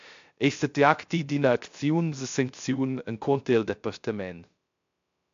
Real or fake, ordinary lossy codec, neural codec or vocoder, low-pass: fake; MP3, 64 kbps; codec, 16 kHz, 0.3 kbps, FocalCodec; 7.2 kHz